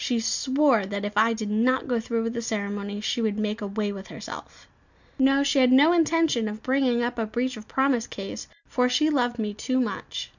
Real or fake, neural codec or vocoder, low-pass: real; none; 7.2 kHz